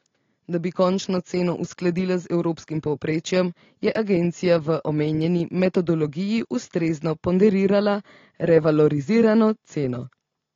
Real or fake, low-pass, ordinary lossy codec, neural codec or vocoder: real; 7.2 kHz; AAC, 32 kbps; none